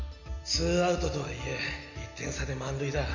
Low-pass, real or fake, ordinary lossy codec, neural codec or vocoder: 7.2 kHz; real; AAC, 48 kbps; none